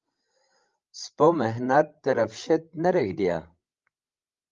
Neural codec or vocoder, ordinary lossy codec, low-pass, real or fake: codec, 16 kHz, 16 kbps, FreqCodec, larger model; Opus, 32 kbps; 7.2 kHz; fake